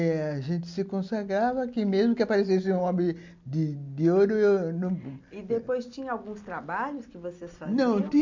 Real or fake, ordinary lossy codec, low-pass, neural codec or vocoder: real; MP3, 64 kbps; 7.2 kHz; none